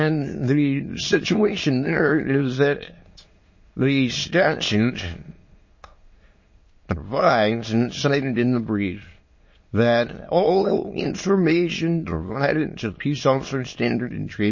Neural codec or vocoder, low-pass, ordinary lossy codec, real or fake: autoencoder, 22.05 kHz, a latent of 192 numbers a frame, VITS, trained on many speakers; 7.2 kHz; MP3, 32 kbps; fake